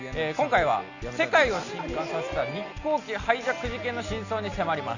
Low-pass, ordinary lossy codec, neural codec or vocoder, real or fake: 7.2 kHz; none; none; real